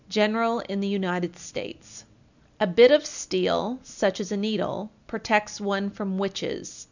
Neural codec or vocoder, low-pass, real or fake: none; 7.2 kHz; real